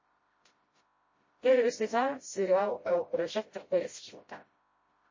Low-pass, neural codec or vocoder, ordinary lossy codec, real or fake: 7.2 kHz; codec, 16 kHz, 0.5 kbps, FreqCodec, smaller model; MP3, 32 kbps; fake